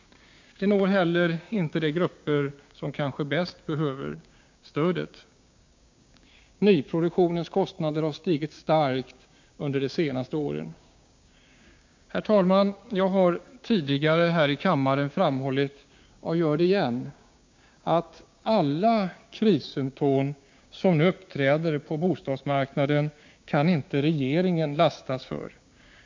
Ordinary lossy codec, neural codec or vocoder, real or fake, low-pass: MP3, 48 kbps; codec, 16 kHz, 6 kbps, DAC; fake; 7.2 kHz